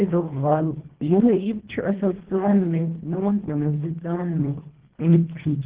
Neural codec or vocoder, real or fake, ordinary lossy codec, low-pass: codec, 24 kHz, 1.5 kbps, HILCodec; fake; Opus, 16 kbps; 3.6 kHz